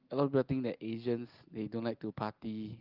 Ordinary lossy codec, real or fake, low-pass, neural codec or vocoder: Opus, 32 kbps; real; 5.4 kHz; none